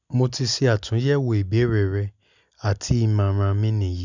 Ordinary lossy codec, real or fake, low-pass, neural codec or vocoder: none; real; 7.2 kHz; none